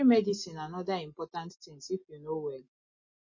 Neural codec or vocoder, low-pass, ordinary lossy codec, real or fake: none; 7.2 kHz; MP3, 32 kbps; real